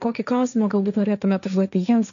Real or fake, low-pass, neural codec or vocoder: fake; 7.2 kHz; codec, 16 kHz, 1.1 kbps, Voila-Tokenizer